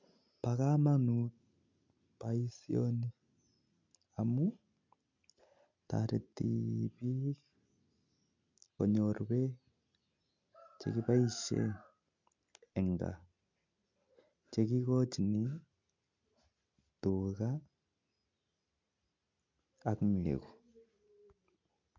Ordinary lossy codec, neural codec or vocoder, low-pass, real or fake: MP3, 64 kbps; none; 7.2 kHz; real